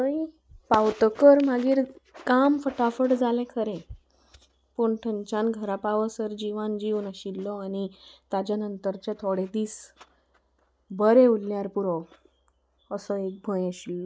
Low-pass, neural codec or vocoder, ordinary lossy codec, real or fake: none; none; none; real